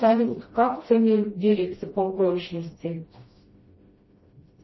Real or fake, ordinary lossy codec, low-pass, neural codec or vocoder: fake; MP3, 24 kbps; 7.2 kHz; codec, 16 kHz, 1 kbps, FreqCodec, smaller model